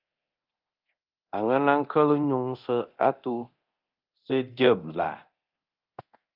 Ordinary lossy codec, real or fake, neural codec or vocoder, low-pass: Opus, 24 kbps; fake; codec, 24 kHz, 0.9 kbps, DualCodec; 5.4 kHz